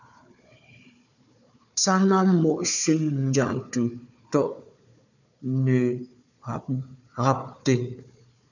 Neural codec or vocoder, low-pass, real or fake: codec, 16 kHz, 4 kbps, FunCodec, trained on Chinese and English, 50 frames a second; 7.2 kHz; fake